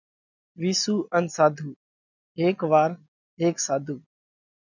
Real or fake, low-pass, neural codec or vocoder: real; 7.2 kHz; none